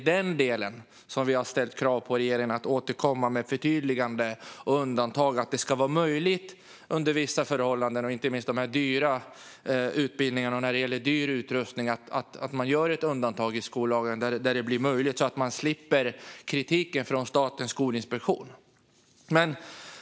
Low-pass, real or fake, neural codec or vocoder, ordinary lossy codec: none; real; none; none